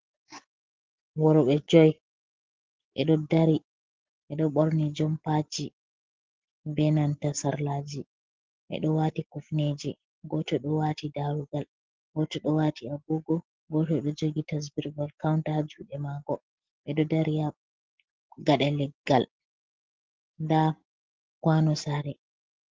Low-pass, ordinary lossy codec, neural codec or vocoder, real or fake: 7.2 kHz; Opus, 16 kbps; none; real